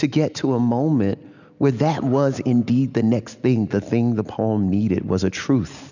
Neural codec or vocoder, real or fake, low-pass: none; real; 7.2 kHz